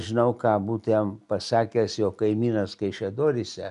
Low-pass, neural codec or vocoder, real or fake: 10.8 kHz; none; real